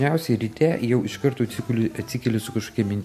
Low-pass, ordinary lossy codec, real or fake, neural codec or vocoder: 14.4 kHz; MP3, 64 kbps; real; none